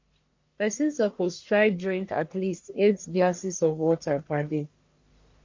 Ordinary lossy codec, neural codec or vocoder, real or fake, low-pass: MP3, 48 kbps; codec, 44.1 kHz, 1.7 kbps, Pupu-Codec; fake; 7.2 kHz